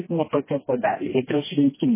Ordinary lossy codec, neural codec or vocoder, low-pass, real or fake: MP3, 16 kbps; codec, 16 kHz, 1 kbps, FreqCodec, smaller model; 3.6 kHz; fake